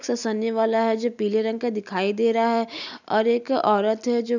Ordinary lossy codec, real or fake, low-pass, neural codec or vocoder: none; real; 7.2 kHz; none